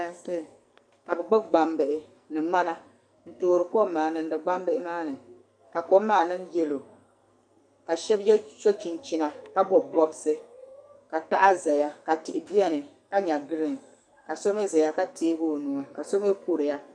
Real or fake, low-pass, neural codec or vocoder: fake; 9.9 kHz; codec, 44.1 kHz, 2.6 kbps, SNAC